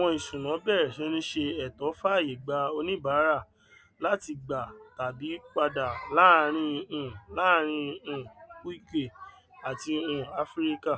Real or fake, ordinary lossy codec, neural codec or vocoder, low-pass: real; none; none; none